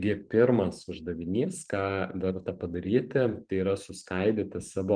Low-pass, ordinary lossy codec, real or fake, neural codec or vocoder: 9.9 kHz; Opus, 32 kbps; fake; vocoder, 44.1 kHz, 128 mel bands every 512 samples, BigVGAN v2